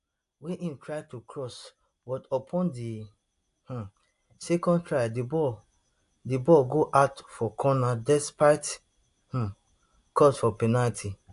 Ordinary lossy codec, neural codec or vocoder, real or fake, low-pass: AAC, 64 kbps; none; real; 10.8 kHz